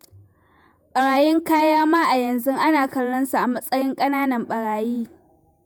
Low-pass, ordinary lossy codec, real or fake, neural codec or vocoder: none; none; fake; vocoder, 48 kHz, 128 mel bands, Vocos